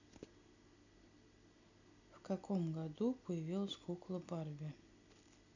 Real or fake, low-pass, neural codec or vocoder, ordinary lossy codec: real; 7.2 kHz; none; none